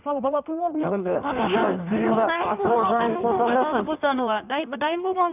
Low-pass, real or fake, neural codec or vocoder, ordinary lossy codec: 3.6 kHz; fake; codec, 16 kHz in and 24 kHz out, 1.1 kbps, FireRedTTS-2 codec; none